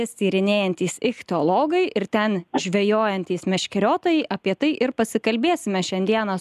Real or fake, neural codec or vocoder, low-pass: real; none; 14.4 kHz